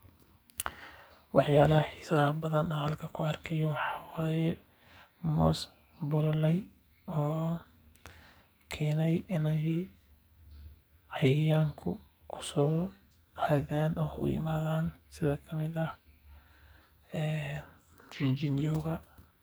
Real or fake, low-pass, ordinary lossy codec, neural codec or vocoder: fake; none; none; codec, 44.1 kHz, 2.6 kbps, SNAC